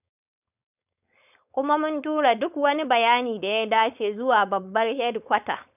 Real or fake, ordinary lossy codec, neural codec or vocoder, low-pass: fake; none; codec, 16 kHz, 4.8 kbps, FACodec; 3.6 kHz